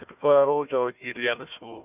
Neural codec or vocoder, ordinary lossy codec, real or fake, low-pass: codec, 16 kHz, 1 kbps, FunCodec, trained on Chinese and English, 50 frames a second; none; fake; 3.6 kHz